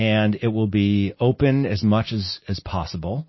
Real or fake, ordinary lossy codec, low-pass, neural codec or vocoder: real; MP3, 24 kbps; 7.2 kHz; none